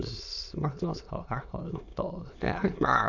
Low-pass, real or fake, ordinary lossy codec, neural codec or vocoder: 7.2 kHz; fake; none; autoencoder, 22.05 kHz, a latent of 192 numbers a frame, VITS, trained on many speakers